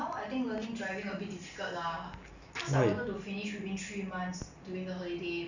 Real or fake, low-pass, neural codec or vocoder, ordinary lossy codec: real; 7.2 kHz; none; none